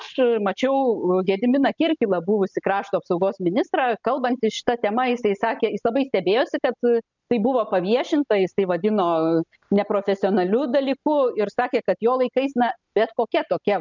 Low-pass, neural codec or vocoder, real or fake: 7.2 kHz; none; real